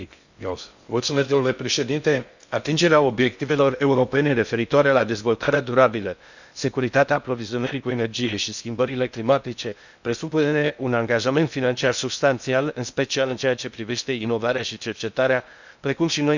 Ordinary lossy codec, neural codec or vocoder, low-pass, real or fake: none; codec, 16 kHz in and 24 kHz out, 0.6 kbps, FocalCodec, streaming, 2048 codes; 7.2 kHz; fake